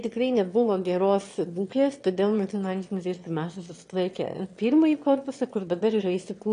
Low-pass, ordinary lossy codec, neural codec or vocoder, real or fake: 9.9 kHz; AAC, 48 kbps; autoencoder, 22.05 kHz, a latent of 192 numbers a frame, VITS, trained on one speaker; fake